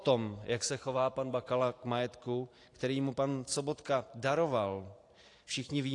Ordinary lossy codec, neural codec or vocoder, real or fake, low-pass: AAC, 48 kbps; vocoder, 44.1 kHz, 128 mel bands every 512 samples, BigVGAN v2; fake; 10.8 kHz